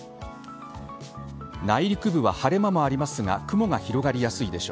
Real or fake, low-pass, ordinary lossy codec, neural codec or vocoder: real; none; none; none